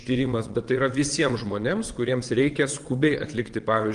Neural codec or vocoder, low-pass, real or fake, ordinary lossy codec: vocoder, 22.05 kHz, 80 mel bands, WaveNeXt; 9.9 kHz; fake; Opus, 24 kbps